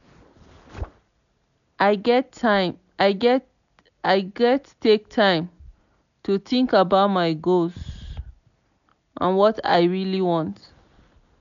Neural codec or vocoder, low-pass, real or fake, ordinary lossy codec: none; 7.2 kHz; real; none